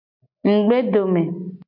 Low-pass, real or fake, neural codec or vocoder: 5.4 kHz; real; none